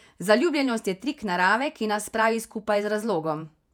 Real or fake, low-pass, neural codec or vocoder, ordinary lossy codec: fake; 19.8 kHz; vocoder, 48 kHz, 128 mel bands, Vocos; none